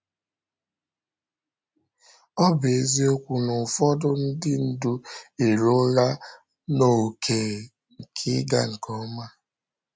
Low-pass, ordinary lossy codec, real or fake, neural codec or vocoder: none; none; real; none